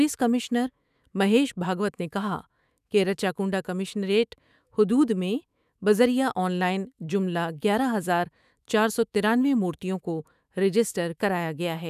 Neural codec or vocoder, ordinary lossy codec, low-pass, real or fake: autoencoder, 48 kHz, 128 numbers a frame, DAC-VAE, trained on Japanese speech; none; 14.4 kHz; fake